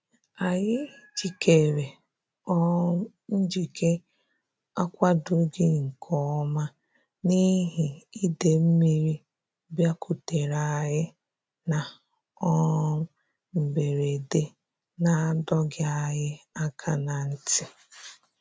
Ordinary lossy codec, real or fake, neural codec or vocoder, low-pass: none; real; none; none